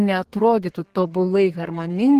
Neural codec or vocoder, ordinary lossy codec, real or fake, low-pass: codec, 32 kHz, 1.9 kbps, SNAC; Opus, 16 kbps; fake; 14.4 kHz